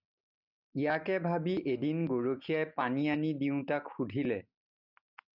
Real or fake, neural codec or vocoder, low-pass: real; none; 5.4 kHz